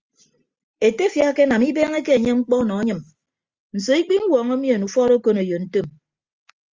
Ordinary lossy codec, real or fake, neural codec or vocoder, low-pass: Opus, 32 kbps; real; none; 7.2 kHz